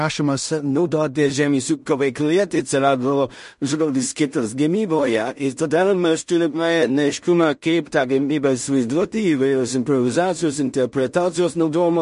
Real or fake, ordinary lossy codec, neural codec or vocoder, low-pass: fake; MP3, 48 kbps; codec, 16 kHz in and 24 kHz out, 0.4 kbps, LongCat-Audio-Codec, two codebook decoder; 10.8 kHz